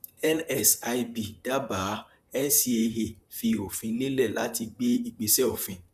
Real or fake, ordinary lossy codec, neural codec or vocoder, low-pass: fake; none; vocoder, 44.1 kHz, 128 mel bands, Pupu-Vocoder; 14.4 kHz